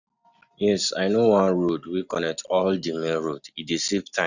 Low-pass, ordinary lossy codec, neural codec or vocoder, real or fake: 7.2 kHz; none; none; real